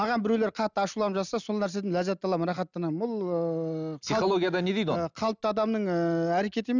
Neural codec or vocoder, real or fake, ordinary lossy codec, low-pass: none; real; none; 7.2 kHz